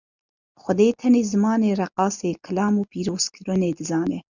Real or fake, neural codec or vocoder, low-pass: real; none; 7.2 kHz